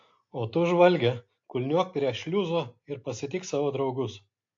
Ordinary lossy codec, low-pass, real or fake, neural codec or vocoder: AAC, 48 kbps; 7.2 kHz; real; none